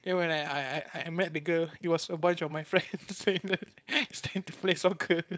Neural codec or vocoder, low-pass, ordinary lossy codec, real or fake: codec, 16 kHz, 4.8 kbps, FACodec; none; none; fake